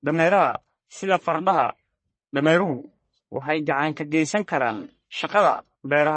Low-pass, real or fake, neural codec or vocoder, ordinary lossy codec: 9.9 kHz; fake; codec, 44.1 kHz, 1.7 kbps, Pupu-Codec; MP3, 32 kbps